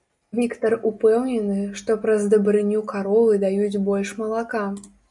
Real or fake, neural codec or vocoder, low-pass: real; none; 10.8 kHz